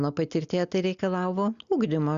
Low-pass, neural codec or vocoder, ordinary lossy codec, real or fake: 7.2 kHz; none; Opus, 64 kbps; real